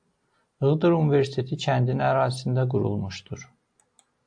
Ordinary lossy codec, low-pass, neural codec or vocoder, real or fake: AAC, 64 kbps; 9.9 kHz; none; real